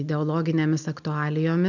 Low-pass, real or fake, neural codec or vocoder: 7.2 kHz; real; none